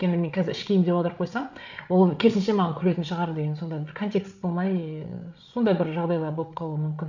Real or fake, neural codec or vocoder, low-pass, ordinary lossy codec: fake; codec, 16 kHz, 8 kbps, FreqCodec, larger model; 7.2 kHz; none